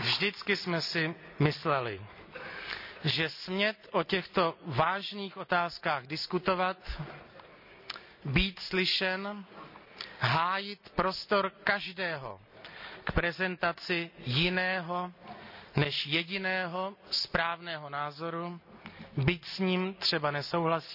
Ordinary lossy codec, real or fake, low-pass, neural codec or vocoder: none; real; 5.4 kHz; none